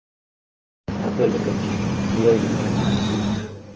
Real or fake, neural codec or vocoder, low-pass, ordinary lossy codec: fake; codec, 16 kHz in and 24 kHz out, 2.2 kbps, FireRedTTS-2 codec; 7.2 kHz; Opus, 24 kbps